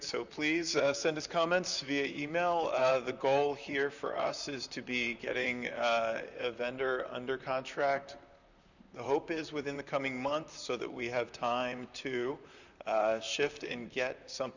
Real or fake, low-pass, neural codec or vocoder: fake; 7.2 kHz; vocoder, 44.1 kHz, 128 mel bands, Pupu-Vocoder